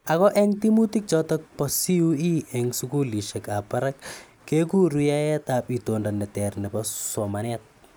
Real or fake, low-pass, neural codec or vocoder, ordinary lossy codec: real; none; none; none